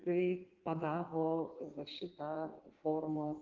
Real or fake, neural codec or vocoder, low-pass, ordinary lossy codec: fake; codec, 16 kHz in and 24 kHz out, 1.1 kbps, FireRedTTS-2 codec; 7.2 kHz; Opus, 32 kbps